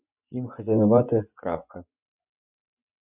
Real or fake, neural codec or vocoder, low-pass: fake; vocoder, 24 kHz, 100 mel bands, Vocos; 3.6 kHz